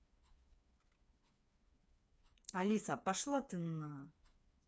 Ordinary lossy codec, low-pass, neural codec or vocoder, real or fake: none; none; codec, 16 kHz, 4 kbps, FreqCodec, smaller model; fake